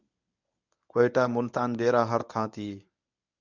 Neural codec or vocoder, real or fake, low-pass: codec, 24 kHz, 0.9 kbps, WavTokenizer, medium speech release version 1; fake; 7.2 kHz